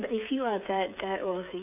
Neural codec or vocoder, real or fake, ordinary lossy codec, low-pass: codec, 16 kHz, 8 kbps, FreqCodec, smaller model; fake; none; 3.6 kHz